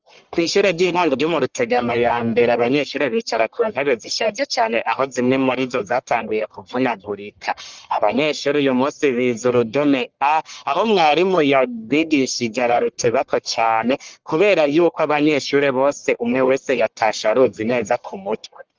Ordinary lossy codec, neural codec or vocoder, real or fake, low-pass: Opus, 32 kbps; codec, 44.1 kHz, 1.7 kbps, Pupu-Codec; fake; 7.2 kHz